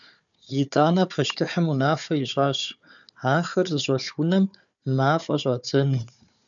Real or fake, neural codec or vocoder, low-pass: fake; codec, 16 kHz, 4 kbps, FunCodec, trained on Chinese and English, 50 frames a second; 7.2 kHz